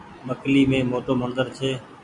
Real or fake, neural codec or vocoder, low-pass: fake; vocoder, 44.1 kHz, 128 mel bands every 256 samples, BigVGAN v2; 10.8 kHz